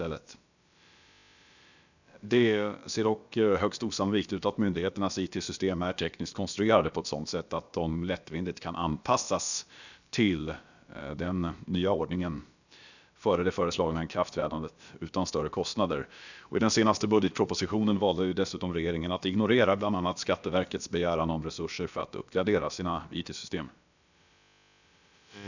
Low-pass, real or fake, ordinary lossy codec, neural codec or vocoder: 7.2 kHz; fake; none; codec, 16 kHz, about 1 kbps, DyCAST, with the encoder's durations